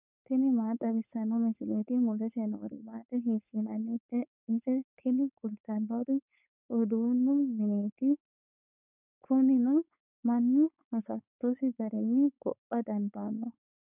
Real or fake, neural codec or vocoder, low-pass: fake; codec, 16 kHz, 4.8 kbps, FACodec; 3.6 kHz